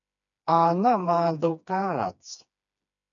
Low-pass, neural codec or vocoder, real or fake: 7.2 kHz; codec, 16 kHz, 2 kbps, FreqCodec, smaller model; fake